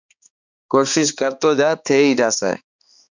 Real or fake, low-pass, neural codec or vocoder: fake; 7.2 kHz; codec, 16 kHz, 2 kbps, X-Codec, HuBERT features, trained on balanced general audio